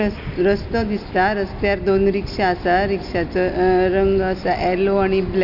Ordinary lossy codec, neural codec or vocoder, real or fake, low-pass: none; none; real; 5.4 kHz